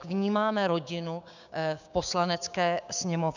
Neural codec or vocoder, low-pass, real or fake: codec, 16 kHz, 6 kbps, DAC; 7.2 kHz; fake